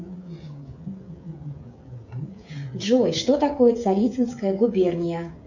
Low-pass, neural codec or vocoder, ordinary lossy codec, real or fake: 7.2 kHz; codec, 16 kHz, 16 kbps, FreqCodec, smaller model; MP3, 64 kbps; fake